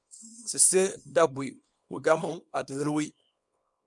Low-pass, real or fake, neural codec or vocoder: 10.8 kHz; fake; codec, 24 kHz, 0.9 kbps, WavTokenizer, small release